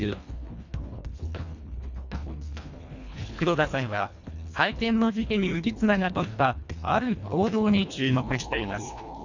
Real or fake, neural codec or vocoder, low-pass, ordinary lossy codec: fake; codec, 24 kHz, 1.5 kbps, HILCodec; 7.2 kHz; none